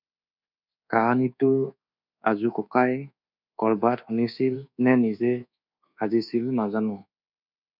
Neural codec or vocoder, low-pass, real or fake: codec, 24 kHz, 1.2 kbps, DualCodec; 5.4 kHz; fake